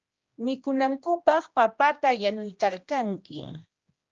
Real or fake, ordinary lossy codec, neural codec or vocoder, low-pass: fake; Opus, 24 kbps; codec, 16 kHz, 1 kbps, X-Codec, HuBERT features, trained on general audio; 7.2 kHz